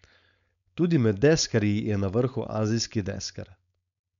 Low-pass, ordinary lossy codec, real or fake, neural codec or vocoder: 7.2 kHz; none; fake; codec, 16 kHz, 4.8 kbps, FACodec